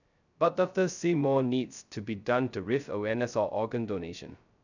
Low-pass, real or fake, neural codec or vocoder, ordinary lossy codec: 7.2 kHz; fake; codec, 16 kHz, 0.2 kbps, FocalCodec; none